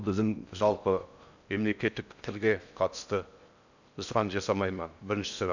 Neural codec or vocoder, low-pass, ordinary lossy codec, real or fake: codec, 16 kHz in and 24 kHz out, 0.6 kbps, FocalCodec, streaming, 4096 codes; 7.2 kHz; none; fake